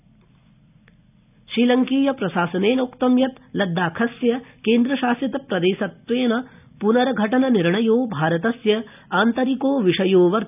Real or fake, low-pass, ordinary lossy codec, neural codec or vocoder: real; 3.6 kHz; none; none